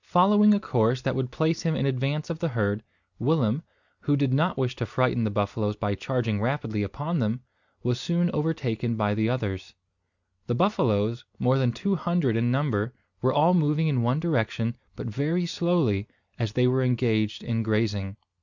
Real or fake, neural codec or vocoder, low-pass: real; none; 7.2 kHz